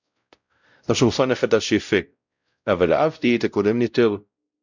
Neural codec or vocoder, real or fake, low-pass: codec, 16 kHz, 0.5 kbps, X-Codec, WavLM features, trained on Multilingual LibriSpeech; fake; 7.2 kHz